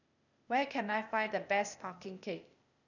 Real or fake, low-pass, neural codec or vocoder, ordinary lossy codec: fake; 7.2 kHz; codec, 16 kHz, 0.8 kbps, ZipCodec; none